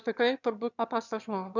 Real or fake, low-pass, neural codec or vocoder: fake; 7.2 kHz; autoencoder, 22.05 kHz, a latent of 192 numbers a frame, VITS, trained on one speaker